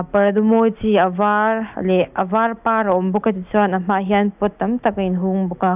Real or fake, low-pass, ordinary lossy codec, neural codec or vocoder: real; 3.6 kHz; none; none